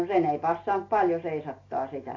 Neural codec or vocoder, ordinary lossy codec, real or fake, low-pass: none; AAC, 32 kbps; real; 7.2 kHz